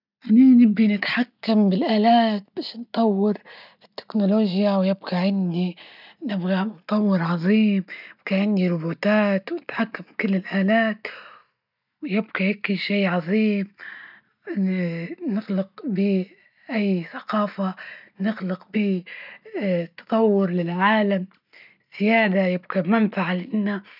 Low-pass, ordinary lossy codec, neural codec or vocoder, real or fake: 5.4 kHz; none; none; real